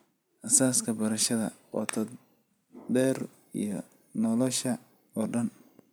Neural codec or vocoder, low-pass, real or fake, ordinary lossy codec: vocoder, 44.1 kHz, 128 mel bands every 256 samples, BigVGAN v2; none; fake; none